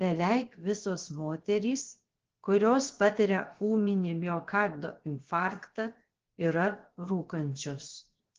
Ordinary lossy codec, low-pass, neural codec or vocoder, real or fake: Opus, 16 kbps; 7.2 kHz; codec, 16 kHz, 0.7 kbps, FocalCodec; fake